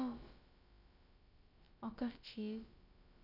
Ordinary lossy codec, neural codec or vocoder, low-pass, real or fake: none; codec, 16 kHz, about 1 kbps, DyCAST, with the encoder's durations; 5.4 kHz; fake